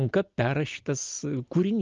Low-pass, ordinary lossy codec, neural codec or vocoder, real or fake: 7.2 kHz; Opus, 24 kbps; none; real